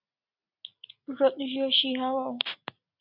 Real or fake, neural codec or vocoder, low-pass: real; none; 5.4 kHz